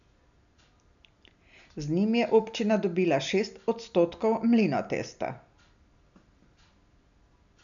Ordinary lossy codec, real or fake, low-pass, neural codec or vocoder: none; real; 7.2 kHz; none